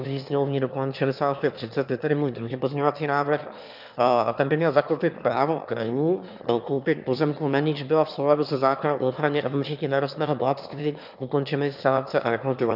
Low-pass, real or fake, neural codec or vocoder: 5.4 kHz; fake; autoencoder, 22.05 kHz, a latent of 192 numbers a frame, VITS, trained on one speaker